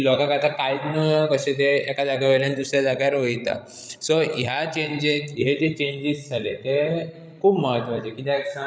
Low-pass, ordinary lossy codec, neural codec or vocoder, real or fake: none; none; codec, 16 kHz, 16 kbps, FreqCodec, larger model; fake